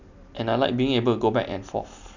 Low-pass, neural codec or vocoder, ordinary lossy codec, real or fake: 7.2 kHz; none; none; real